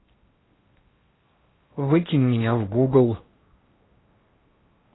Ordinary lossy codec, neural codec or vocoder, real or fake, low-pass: AAC, 16 kbps; codec, 16 kHz in and 24 kHz out, 0.8 kbps, FocalCodec, streaming, 65536 codes; fake; 7.2 kHz